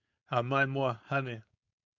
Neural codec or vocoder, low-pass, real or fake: codec, 16 kHz, 4.8 kbps, FACodec; 7.2 kHz; fake